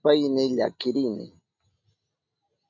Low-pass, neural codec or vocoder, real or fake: 7.2 kHz; none; real